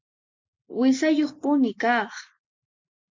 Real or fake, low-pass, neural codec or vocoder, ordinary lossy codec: real; 7.2 kHz; none; MP3, 64 kbps